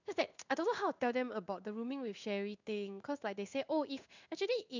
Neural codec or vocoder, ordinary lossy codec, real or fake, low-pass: codec, 16 kHz in and 24 kHz out, 1 kbps, XY-Tokenizer; none; fake; 7.2 kHz